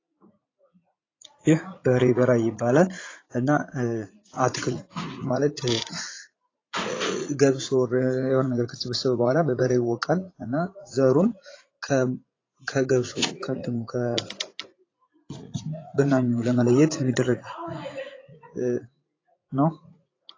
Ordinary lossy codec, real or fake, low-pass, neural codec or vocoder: AAC, 32 kbps; fake; 7.2 kHz; vocoder, 44.1 kHz, 80 mel bands, Vocos